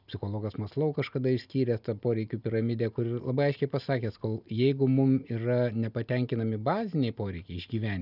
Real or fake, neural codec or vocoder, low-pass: real; none; 5.4 kHz